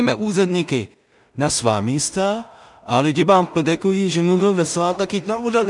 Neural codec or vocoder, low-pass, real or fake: codec, 16 kHz in and 24 kHz out, 0.4 kbps, LongCat-Audio-Codec, two codebook decoder; 10.8 kHz; fake